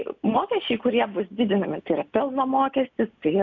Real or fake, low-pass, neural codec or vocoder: real; 7.2 kHz; none